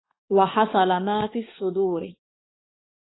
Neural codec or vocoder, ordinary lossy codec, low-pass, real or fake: codec, 16 kHz, 4 kbps, X-Codec, HuBERT features, trained on balanced general audio; AAC, 16 kbps; 7.2 kHz; fake